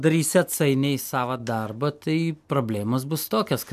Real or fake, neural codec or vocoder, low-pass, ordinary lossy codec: real; none; 14.4 kHz; MP3, 96 kbps